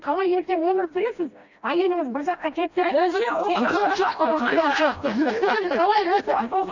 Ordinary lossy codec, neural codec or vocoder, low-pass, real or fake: none; codec, 16 kHz, 1 kbps, FreqCodec, smaller model; 7.2 kHz; fake